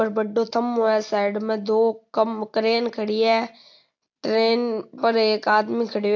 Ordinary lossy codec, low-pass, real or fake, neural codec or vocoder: AAC, 48 kbps; 7.2 kHz; real; none